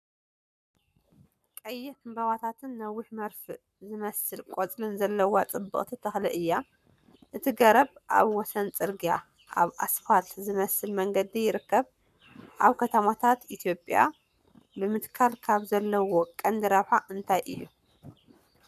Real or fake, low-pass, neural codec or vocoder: fake; 14.4 kHz; codec, 44.1 kHz, 7.8 kbps, Pupu-Codec